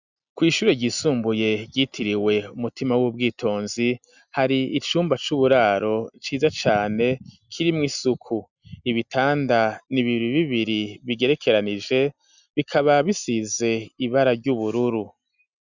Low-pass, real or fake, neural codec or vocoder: 7.2 kHz; real; none